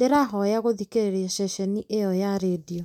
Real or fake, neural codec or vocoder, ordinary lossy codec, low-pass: real; none; none; 19.8 kHz